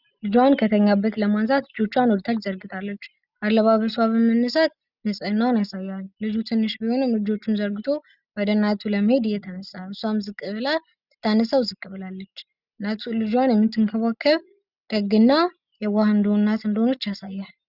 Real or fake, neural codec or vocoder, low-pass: real; none; 5.4 kHz